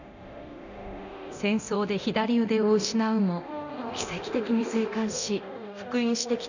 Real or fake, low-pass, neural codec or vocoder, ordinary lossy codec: fake; 7.2 kHz; codec, 24 kHz, 0.9 kbps, DualCodec; none